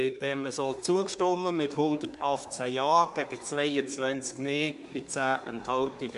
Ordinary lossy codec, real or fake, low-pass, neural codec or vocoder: none; fake; 10.8 kHz; codec, 24 kHz, 1 kbps, SNAC